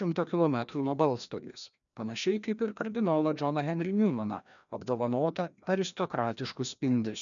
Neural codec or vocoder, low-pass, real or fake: codec, 16 kHz, 1 kbps, FreqCodec, larger model; 7.2 kHz; fake